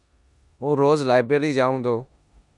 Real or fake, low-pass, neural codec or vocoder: fake; 10.8 kHz; codec, 16 kHz in and 24 kHz out, 0.9 kbps, LongCat-Audio-Codec, four codebook decoder